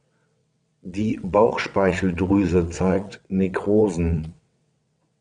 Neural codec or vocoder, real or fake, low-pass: vocoder, 22.05 kHz, 80 mel bands, WaveNeXt; fake; 9.9 kHz